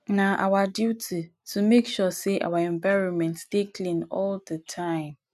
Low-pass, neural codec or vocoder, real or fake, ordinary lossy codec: 14.4 kHz; none; real; none